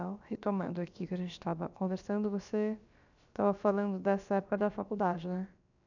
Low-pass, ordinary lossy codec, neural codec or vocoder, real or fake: 7.2 kHz; none; codec, 16 kHz, about 1 kbps, DyCAST, with the encoder's durations; fake